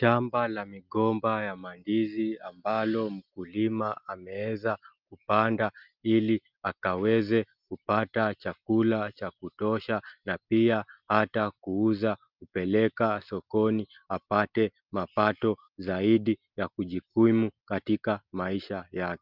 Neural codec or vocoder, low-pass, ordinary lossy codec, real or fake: none; 5.4 kHz; Opus, 24 kbps; real